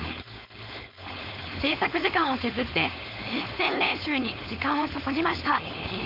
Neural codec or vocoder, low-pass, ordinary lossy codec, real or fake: codec, 16 kHz, 4.8 kbps, FACodec; 5.4 kHz; MP3, 48 kbps; fake